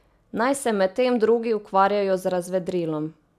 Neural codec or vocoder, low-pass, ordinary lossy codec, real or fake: none; 14.4 kHz; AAC, 96 kbps; real